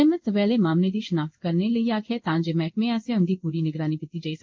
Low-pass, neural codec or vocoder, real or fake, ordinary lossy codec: 7.2 kHz; codec, 16 kHz in and 24 kHz out, 1 kbps, XY-Tokenizer; fake; Opus, 32 kbps